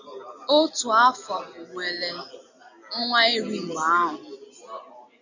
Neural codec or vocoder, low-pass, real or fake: none; 7.2 kHz; real